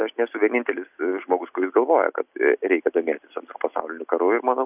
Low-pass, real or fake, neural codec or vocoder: 3.6 kHz; real; none